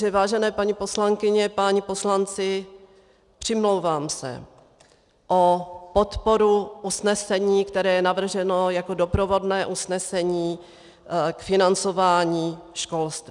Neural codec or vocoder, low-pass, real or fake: none; 10.8 kHz; real